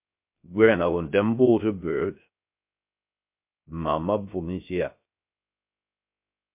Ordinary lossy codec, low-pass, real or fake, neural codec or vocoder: MP3, 32 kbps; 3.6 kHz; fake; codec, 16 kHz, 0.3 kbps, FocalCodec